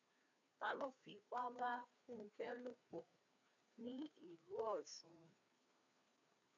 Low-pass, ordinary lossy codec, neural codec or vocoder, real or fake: 7.2 kHz; AAC, 48 kbps; codec, 16 kHz, 2 kbps, FreqCodec, larger model; fake